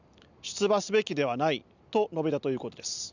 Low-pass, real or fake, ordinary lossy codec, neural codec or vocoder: 7.2 kHz; real; none; none